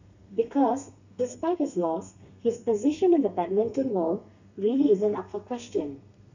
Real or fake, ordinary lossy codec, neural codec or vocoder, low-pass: fake; none; codec, 32 kHz, 1.9 kbps, SNAC; 7.2 kHz